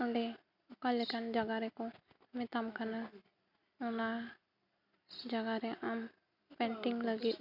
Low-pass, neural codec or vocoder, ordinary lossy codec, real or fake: 5.4 kHz; none; none; real